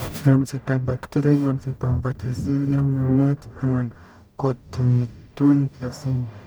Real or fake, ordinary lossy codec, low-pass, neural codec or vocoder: fake; none; none; codec, 44.1 kHz, 0.9 kbps, DAC